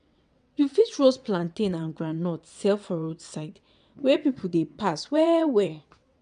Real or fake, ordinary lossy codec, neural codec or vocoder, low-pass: fake; none; vocoder, 22.05 kHz, 80 mel bands, WaveNeXt; 9.9 kHz